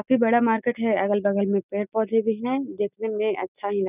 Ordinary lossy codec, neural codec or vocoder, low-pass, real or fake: none; none; 3.6 kHz; real